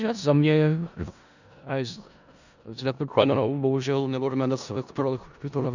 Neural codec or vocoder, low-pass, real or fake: codec, 16 kHz in and 24 kHz out, 0.4 kbps, LongCat-Audio-Codec, four codebook decoder; 7.2 kHz; fake